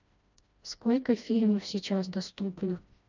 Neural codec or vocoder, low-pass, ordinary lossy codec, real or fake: codec, 16 kHz, 1 kbps, FreqCodec, smaller model; 7.2 kHz; none; fake